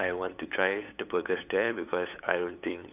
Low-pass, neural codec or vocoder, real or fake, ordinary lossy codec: 3.6 kHz; codec, 16 kHz, 8 kbps, FunCodec, trained on LibriTTS, 25 frames a second; fake; none